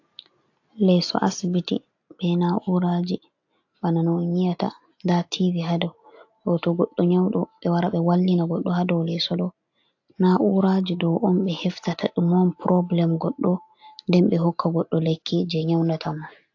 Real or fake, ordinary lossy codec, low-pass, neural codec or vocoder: real; AAC, 48 kbps; 7.2 kHz; none